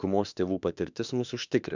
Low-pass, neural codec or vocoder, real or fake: 7.2 kHz; autoencoder, 48 kHz, 32 numbers a frame, DAC-VAE, trained on Japanese speech; fake